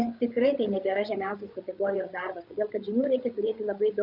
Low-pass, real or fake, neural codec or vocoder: 5.4 kHz; fake; codec, 16 kHz, 8 kbps, FreqCodec, larger model